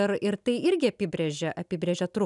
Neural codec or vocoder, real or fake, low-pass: none; real; 10.8 kHz